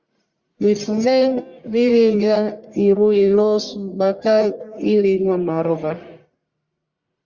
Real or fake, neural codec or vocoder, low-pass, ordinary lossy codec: fake; codec, 44.1 kHz, 1.7 kbps, Pupu-Codec; 7.2 kHz; Opus, 64 kbps